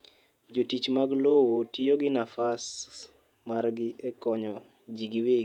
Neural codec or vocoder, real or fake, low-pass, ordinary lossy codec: vocoder, 48 kHz, 128 mel bands, Vocos; fake; 19.8 kHz; none